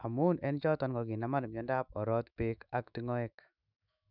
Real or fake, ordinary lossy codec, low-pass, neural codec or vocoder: fake; none; 5.4 kHz; autoencoder, 48 kHz, 128 numbers a frame, DAC-VAE, trained on Japanese speech